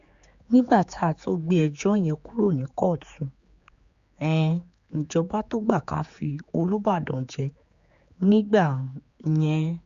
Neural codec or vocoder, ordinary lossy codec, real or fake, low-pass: codec, 16 kHz, 4 kbps, X-Codec, HuBERT features, trained on general audio; Opus, 64 kbps; fake; 7.2 kHz